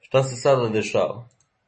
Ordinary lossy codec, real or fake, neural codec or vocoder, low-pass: MP3, 32 kbps; real; none; 10.8 kHz